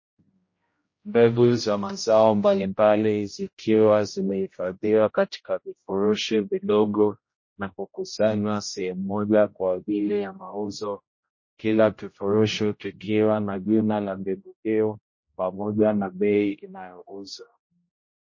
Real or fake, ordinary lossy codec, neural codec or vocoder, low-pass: fake; MP3, 32 kbps; codec, 16 kHz, 0.5 kbps, X-Codec, HuBERT features, trained on general audio; 7.2 kHz